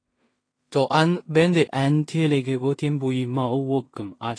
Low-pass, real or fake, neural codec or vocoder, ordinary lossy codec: 9.9 kHz; fake; codec, 16 kHz in and 24 kHz out, 0.4 kbps, LongCat-Audio-Codec, two codebook decoder; AAC, 32 kbps